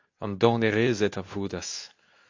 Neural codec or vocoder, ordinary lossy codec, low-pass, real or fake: codec, 24 kHz, 0.9 kbps, WavTokenizer, medium speech release version 2; AAC, 48 kbps; 7.2 kHz; fake